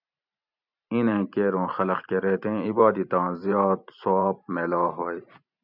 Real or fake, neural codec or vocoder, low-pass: fake; vocoder, 44.1 kHz, 128 mel bands every 512 samples, BigVGAN v2; 5.4 kHz